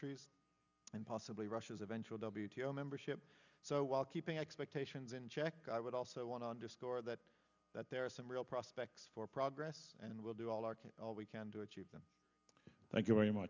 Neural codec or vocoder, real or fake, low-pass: none; real; 7.2 kHz